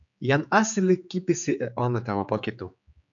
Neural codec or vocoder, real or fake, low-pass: codec, 16 kHz, 4 kbps, X-Codec, HuBERT features, trained on general audio; fake; 7.2 kHz